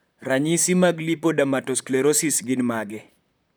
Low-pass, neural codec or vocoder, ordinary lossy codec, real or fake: none; vocoder, 44.1 kHz, 128 mel bands, Pupu-Vocoder; none; fake